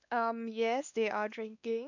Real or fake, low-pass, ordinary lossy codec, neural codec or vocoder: fake; 7.2 kHz; none; codec, 16 kHz, 2 kbps, X-Codec, WavLM features, trained on Multilingual LibriSpeech